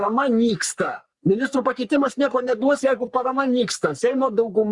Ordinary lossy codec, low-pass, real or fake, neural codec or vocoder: Opus, 64 kbps; 10.8 kHz; fake; codec, 44.1 kHz, 3.4 kbps, Pupu-Codec